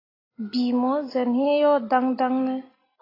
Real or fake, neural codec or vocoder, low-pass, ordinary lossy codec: real; none; 5.4 kHz; AAC, 24 kbps